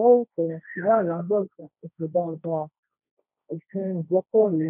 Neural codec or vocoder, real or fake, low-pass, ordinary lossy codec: codec, 16 kHz, 1.1 kbps, Voila-Tokenizer; fake; 3.6 kHz; none